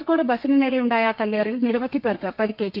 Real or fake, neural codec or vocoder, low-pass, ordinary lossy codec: fake; codec, 32 kHz, 1.9 kbps, SNAC; 5.4 kHz; none